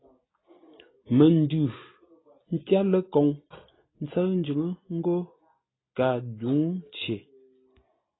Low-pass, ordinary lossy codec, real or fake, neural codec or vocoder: 7.2 kHz; AAC, 16 kbps; real; none